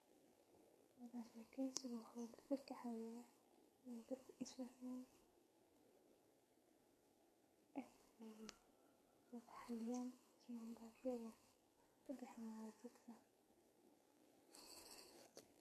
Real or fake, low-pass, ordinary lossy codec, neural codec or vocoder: fake; 14.4 kHz; MP3, 64 kbps; codec, 44.1 kHz, 2.6 kbps, SNAC